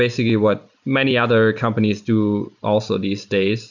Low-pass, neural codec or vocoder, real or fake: 7.2 kHz; none; real